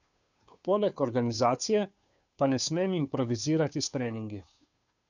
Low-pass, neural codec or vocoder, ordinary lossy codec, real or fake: 7.2 kHz; codec, 16 kHz, 2 kbps, FunCodec, trained on Chinese and English, 25 frames a second; none; fake